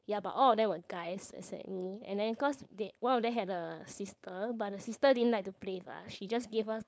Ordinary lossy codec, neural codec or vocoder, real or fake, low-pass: none; codec, 16 kHz, 4.8 kbps, FACodec; fake; none